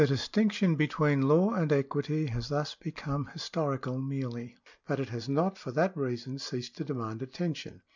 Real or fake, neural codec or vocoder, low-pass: real; none; 7.2 kHz